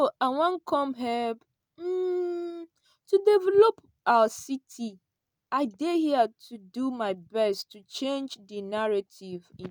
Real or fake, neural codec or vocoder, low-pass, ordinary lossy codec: real; none; none; none